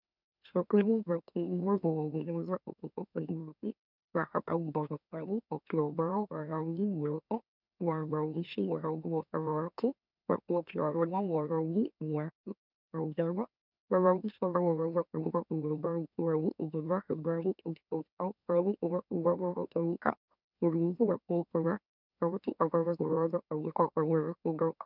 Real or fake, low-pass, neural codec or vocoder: fake; 5.4 kHz; autoencoder, 44.1 kHz, a latent of 192 numbers a frame, MeloTTS